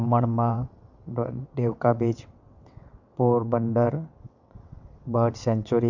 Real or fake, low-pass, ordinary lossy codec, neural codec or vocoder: fake; 7.2 kHz; none; vocoder, 22.05 kHz, 80 mel bands, WaveNeXt